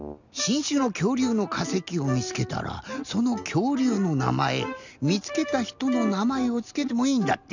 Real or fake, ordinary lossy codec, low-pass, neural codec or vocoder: real; none; 7.2 kHz; none